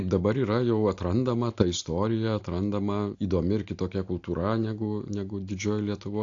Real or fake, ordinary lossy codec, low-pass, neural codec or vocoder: real; AAC, 48 kbps; 7.2 kHz; none